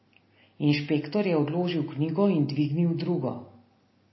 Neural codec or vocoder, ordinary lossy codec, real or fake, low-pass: none; MP3, 24 kbps; real; 7.2 kHz